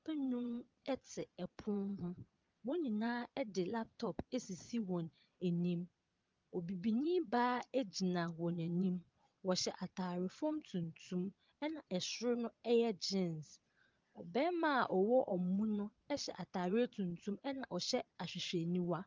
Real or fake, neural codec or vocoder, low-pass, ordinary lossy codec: fake; vocoder, 22.05 kHz, 80 mel bands, Vocos; 7.2 kHz; Opus, 32 kbps